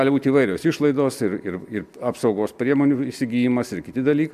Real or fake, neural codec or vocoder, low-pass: real; none; 14.4 kHz